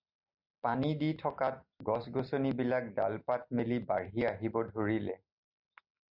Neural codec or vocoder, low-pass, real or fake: none; 5.4 kHz; real